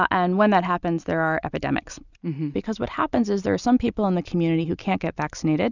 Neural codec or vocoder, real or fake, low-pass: none; real; 7.2 kHz